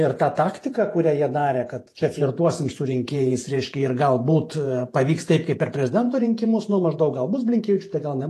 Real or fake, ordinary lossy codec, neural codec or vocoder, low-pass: fake; AAC, 48 kbps; vocoder, 48 kHz, 128 mel bands, Vocos; 14.4 kHz